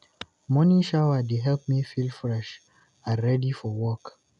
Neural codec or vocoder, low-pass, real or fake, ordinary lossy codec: none; 10.8 kHz; real; none